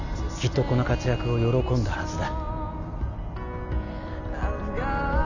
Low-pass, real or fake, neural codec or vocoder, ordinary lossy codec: 7.2 kHz; real; none; none